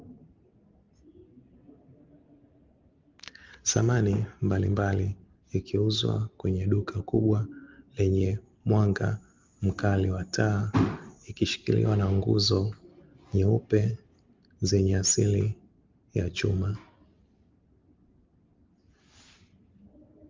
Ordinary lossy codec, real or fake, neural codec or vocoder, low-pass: Opus, 24 kbps; real; none; 7.2 kHz